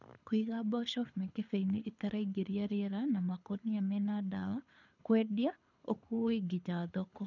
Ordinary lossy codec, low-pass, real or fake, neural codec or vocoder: none; 7.2 kHz; fake; codec, 24 kHz, 6 kbps, HILCodec